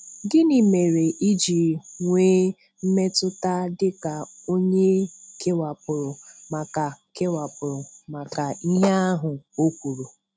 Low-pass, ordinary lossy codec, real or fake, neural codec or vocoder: none; none; real; none